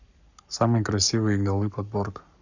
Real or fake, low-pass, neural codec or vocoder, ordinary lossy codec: fake; 7.2 kHz; codec, 44.1 kHz, 7.8 kbps, Pupu-Codec; none